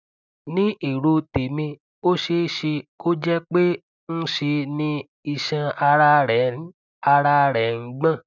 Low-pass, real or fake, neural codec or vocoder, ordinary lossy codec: 7.2 kHz; real; none; none